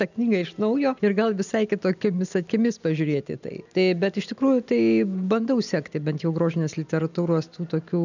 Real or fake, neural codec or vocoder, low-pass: real; none; 7.2 kHz